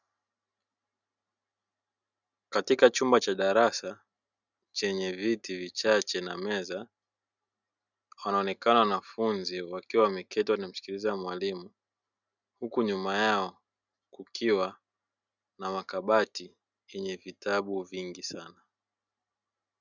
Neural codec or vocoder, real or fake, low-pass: none; real; 7.2 kHz